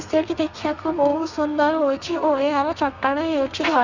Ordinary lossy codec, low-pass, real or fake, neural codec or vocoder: none; 7.2 kHz; fake; codec, 32 kHz, 1.9 kbps, SNAC